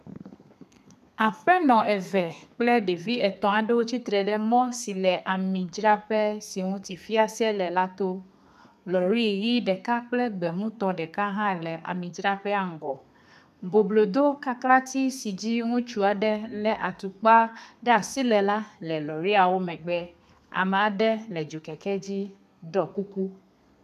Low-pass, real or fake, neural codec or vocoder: 14.4 kHz; fake; codec, 32 kHz, 1.9 kbps, SNAC